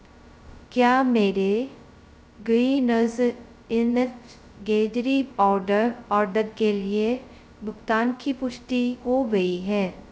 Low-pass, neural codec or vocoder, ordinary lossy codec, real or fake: none; codec, 16 kHz, 0.2 kbps, FocalCodec; none; fake